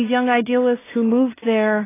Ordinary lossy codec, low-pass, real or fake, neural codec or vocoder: AAC, 16 kbps; 3.6 kHz; fake; codec, 16 kHz in and 24 kHz out, 0.4 kbps, LongCat-Audio-Codec, two codebook decoder